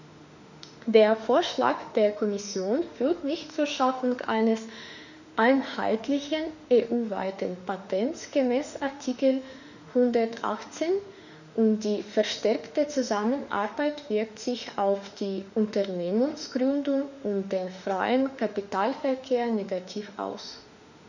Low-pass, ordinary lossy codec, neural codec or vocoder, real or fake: 7.2 kHz; none; autoencoder, 48 kHz, 32 numbers a frame, DAC-VAE, trained on Japanese speech; fake